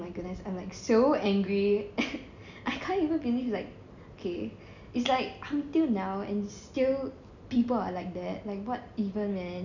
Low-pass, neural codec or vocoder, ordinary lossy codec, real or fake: 7.2 kHz; none; none; real